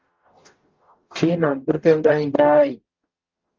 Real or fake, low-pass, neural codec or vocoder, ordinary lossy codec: fake; 7.2 kHz; codec, 44.1 kHz, 0.9 kbps, DAC; Opus, 32 kbps